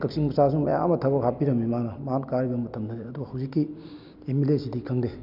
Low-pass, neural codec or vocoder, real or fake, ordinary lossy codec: 5.4 kHz; none; real; none